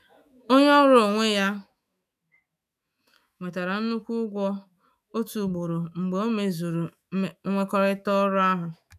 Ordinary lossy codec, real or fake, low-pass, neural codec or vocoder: none; fake; 14.4 kHz; autoencoder, 48 kHz, 128 numbers a frame, DAC-VAE, trained on Japanese speech